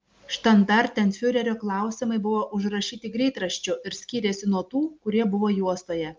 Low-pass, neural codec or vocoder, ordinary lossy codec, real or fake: 7.2 kHz; none; Opus, 24 kbps; real